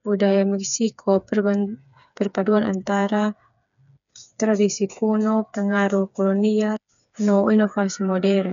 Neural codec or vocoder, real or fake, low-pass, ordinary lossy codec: codec, 16 kHz, 4 kbps, FreqCodec, smaller model; fake; 7.2 kHz; none